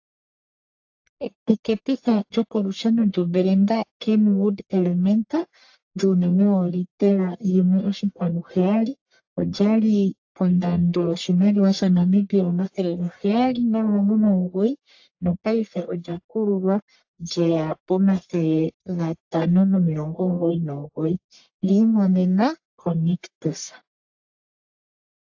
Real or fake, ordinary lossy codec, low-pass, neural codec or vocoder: fake; AAC, 48 kbps; 7.2 kHz; codec, 44.1 kHz, 1.7 kbps, Pupu-Codec